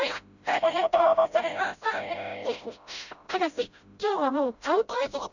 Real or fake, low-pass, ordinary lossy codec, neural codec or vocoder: fake; 7.2 kHz; none; codec, 16 kHz, 0.5 kbps, FreqCodec, smaller model